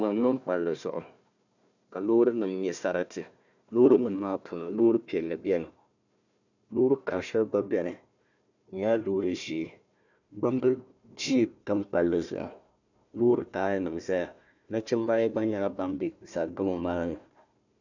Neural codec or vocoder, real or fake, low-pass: codec, 16 kHz, 1 kbps, FunCodec, trained on Chinese and English, 50 frames a second; fake; 7.2 kHz